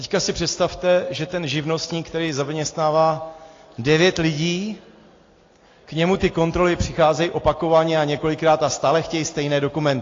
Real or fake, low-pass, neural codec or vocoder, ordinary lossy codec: real; 7.2 kHz; none; AAC, 32 kbps